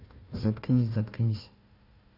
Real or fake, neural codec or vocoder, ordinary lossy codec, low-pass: fake; codec, 16 kHz, 1 kbps, FunCodec, trained on Chinese and English, 50 frames a second; none; 5.4 kHz